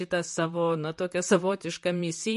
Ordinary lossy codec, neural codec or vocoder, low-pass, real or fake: MP3, 48 kbps; vocoder, 44.1 kHz, 128 mel bands, Pupu-Vocoder; 14.4 kHz; fake